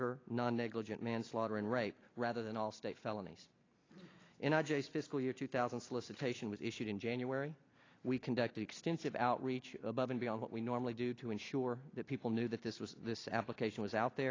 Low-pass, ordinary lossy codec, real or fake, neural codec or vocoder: 7.2 kHz; AAC, 32 kbps; real; none